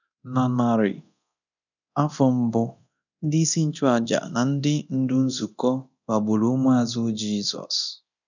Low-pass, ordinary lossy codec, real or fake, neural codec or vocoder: 7.2 kHz; none; fake; codec, 24 kHz, 0.9 kbps, DualCodec